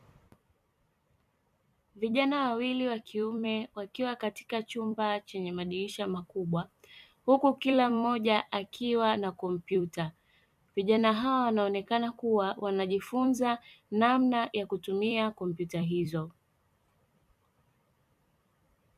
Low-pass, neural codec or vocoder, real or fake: 14.4 kHz; vocoder, 44.1 kHz, 128 mel bands every 256 samples, BigVGAN v2; fake